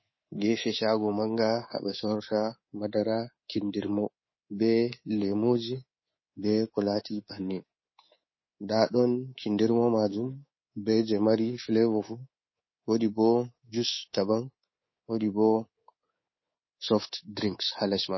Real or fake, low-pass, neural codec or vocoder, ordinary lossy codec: fake; 7.2 kHz; codec, 24 kHz, 3.1 kbps, DualCodec; MP3, 24 kbps